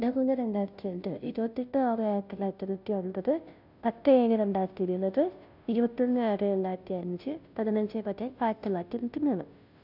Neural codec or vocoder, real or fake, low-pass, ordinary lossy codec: codec, 16 kHz, 0.5 kbps, FunCodec, trained on Chinese and English, 25 frames a second; fake; 5.4 kHz; none